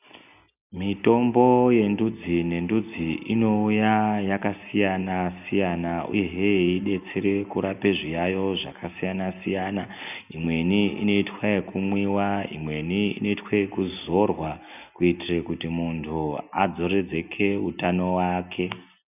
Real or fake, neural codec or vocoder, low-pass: real; none; 3.6 kHz